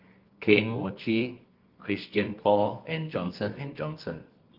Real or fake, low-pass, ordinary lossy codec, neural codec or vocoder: fake; 5.4 kHz; Opus, 32 kbps; codec, 24 kHz, 0.9 kbps, WavTokenizer, medium music audio release